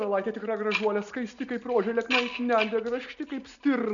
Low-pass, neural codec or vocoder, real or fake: 7.2 kHz; none; real